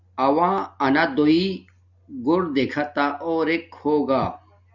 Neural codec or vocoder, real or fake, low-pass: none; real; 7.2 kHz